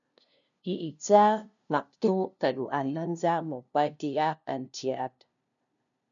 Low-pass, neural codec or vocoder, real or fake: 7.2 kHz; codec, 16 kHz, 0.5 kbps, FunCodec, trained on LibriTTS, 25 frames a second; fake